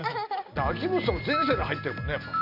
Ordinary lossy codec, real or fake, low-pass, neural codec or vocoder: none; real; 5.4 kHz; none